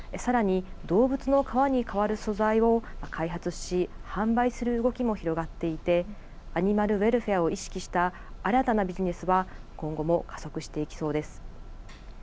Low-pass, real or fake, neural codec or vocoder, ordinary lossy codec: none; real; none; none